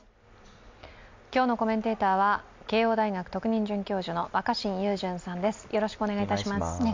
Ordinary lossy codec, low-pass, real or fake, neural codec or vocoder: MP3, 64 kbps; 7.2 kHz; real; none